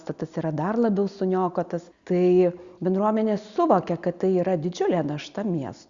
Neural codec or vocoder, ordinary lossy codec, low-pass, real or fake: none; Opus, 64 kbps; 7.2 kHz; real